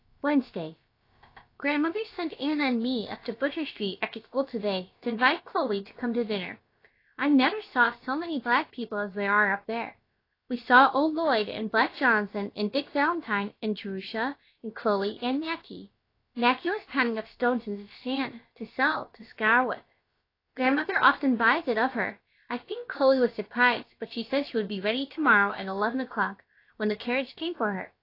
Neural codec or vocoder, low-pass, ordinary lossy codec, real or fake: codec, 16 kHz, about 1 kbps, DyCAST, with the encoder's durations; 5.4 kHz; AAC, 32 kbps; fake